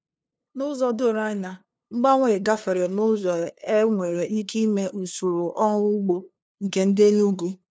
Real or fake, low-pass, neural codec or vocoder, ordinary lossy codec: fake; none; codec, 16 kHz, 2 kbps, FunCodec, trained on LibriTTS, 25 frames a second; none